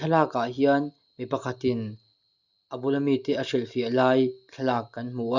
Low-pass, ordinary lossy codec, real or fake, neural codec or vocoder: 7.2 kHz; Opus, 64 kbps; real; none